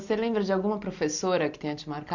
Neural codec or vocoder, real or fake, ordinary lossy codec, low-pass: none; real; none; 7.2 kHz